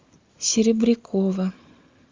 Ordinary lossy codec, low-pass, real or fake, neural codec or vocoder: Opus, 32 kbps; 7.2 kHz; real; none